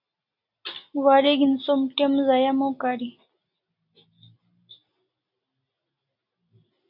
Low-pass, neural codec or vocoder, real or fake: 5.4 kHz; none; real